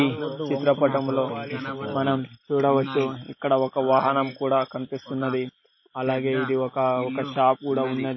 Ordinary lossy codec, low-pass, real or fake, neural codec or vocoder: MP3, 24 kbps; 7.2 kHz; real; none